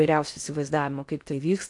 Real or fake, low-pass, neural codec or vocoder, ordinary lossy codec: fake; 10.8 kHz; codec, 16 kHz in and 24 kHz out, 0.6 kbps, FocalCodec, streaming, 4096 codes; AAC, 64 kbps